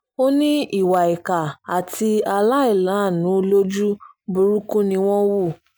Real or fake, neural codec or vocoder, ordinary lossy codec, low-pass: real; none; none; none